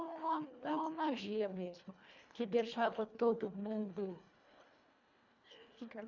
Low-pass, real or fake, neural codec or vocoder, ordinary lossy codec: 7.2 kHz; fake; codec, 24 kHz, 1.5 kbps, HILCodec; none